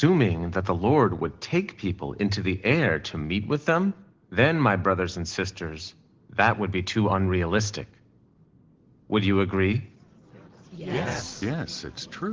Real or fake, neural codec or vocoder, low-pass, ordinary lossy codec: real; none; 7.2 kHz; Opus, 16 kbps